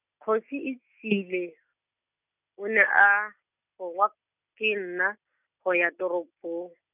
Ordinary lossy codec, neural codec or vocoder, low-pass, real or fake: none; none; 3.6 kHz; real